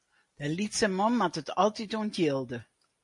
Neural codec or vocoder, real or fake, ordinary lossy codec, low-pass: none; real; MP3, 48 kbps; 10.8 kHz